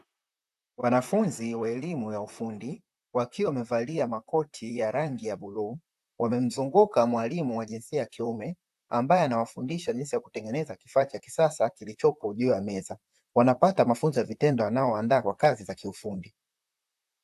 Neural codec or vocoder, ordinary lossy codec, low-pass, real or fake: vocoder, 44.1 kHz, 128 mel bands, Pupu-Vocoder; AAC, 96 kbps; 14.4 kHz; fake